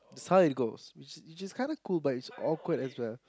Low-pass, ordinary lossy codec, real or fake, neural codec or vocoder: none; none; real; none